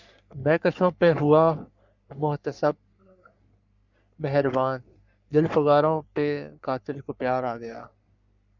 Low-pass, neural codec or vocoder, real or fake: 7.2 kHz; codec, 44.1 kHz, 3.4 kbps, Pupu-Codec; fake